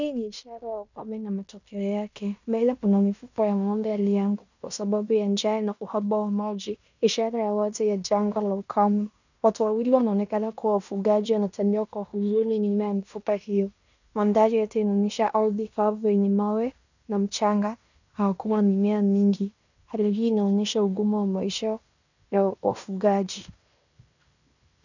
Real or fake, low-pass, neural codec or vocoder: fake; 7.2 kHz; codec, 16 kHz in and 24 kHz out, 0.9 kbps, LongCat-Audio-Codec, fine tuned four codebook decoder